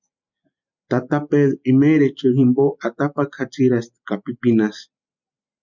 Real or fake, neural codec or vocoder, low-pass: real; none; 7.2 kHz